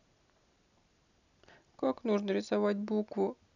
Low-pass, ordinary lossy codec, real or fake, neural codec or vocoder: 7.2 kHz; none; real; none